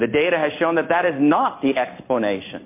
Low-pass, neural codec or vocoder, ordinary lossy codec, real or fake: 3.6 kHz; codec, 16 kHz in and 24 kHz out, 1 kbps, XY-Tokenizer; MP3, 24 kbps; fake